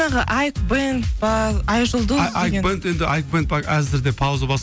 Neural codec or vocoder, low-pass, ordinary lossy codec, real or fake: none; none; none; real